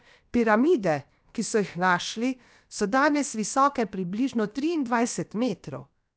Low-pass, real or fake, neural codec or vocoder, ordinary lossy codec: none; fake; codec, 16 kHz, about 1 kbps, DyCAST, with the encoder's durations; none